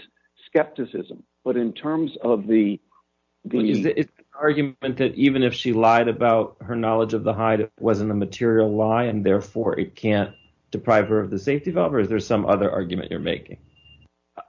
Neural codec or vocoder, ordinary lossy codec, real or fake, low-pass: none; MP3, 48 kbps; real; 7.2 kHz